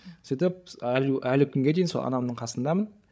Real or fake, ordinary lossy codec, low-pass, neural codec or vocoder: fake; none; none; codec, 16 kHz, 16 kbps, FreqCodec, larger model